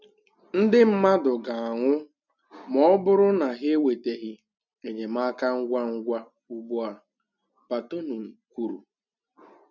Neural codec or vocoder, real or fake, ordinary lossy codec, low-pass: none; real; none; 7.2 kHz